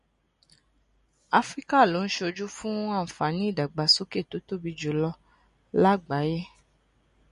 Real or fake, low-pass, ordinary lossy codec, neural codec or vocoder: real; 10.8 kHz; MP3, 48 kbps; none